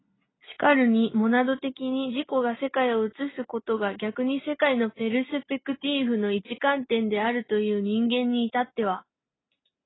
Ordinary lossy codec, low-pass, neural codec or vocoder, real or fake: AAC, 16 kbps; 7.2 kHz; none; real